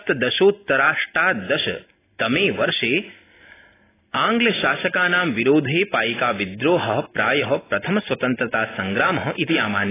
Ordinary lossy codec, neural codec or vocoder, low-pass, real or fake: AAC, 16 kbps; none; 3.6 kHz; real